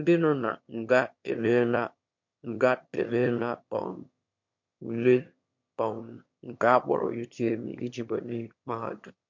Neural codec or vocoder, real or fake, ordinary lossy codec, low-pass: autoencoder, 22.05 kHz, a latent of 192 numbers a frame, VITS, trained on one speaker; fake; MP3, 48 kbps; 7.2 kHz